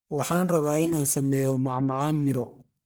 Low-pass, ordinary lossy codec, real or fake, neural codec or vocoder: none; none; fake; codec, 44.1 kHz, 1.7 kbps, Pupu-Codec